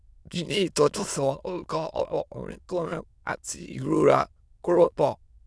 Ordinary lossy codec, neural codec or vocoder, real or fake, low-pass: none; autoencoder, 22.05 kHz, a latent of 192 numbers a frame, VITS, trained on many speakers; fake; none